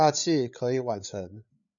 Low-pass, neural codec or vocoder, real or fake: 7.2 kHz; codec, 16 kHz, 8 kbps, FreqCodec, larger model; fake